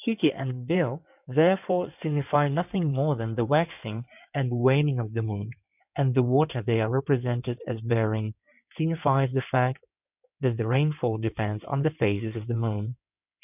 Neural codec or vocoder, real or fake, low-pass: codec, 16 kHz in and 24 kHz out, 2.2 kbps, FireRedTTS-2 codec; fake; 3.6 kHz